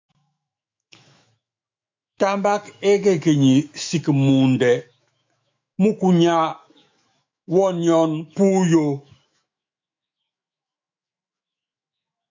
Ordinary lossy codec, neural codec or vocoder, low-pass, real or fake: MP3, 64 kbps; autoencoder, 48 kHz, 128 numbers a frame, DAC-VAE, trained on Japanese speech; 7.2 kHz; fake